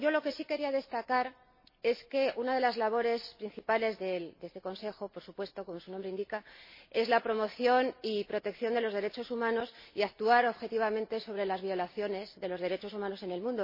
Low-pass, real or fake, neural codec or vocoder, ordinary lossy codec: 5.4 kHz; real; none; MP3, 24 kbps